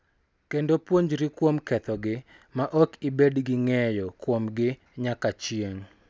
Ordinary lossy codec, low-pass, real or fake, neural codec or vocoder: none; none; real; none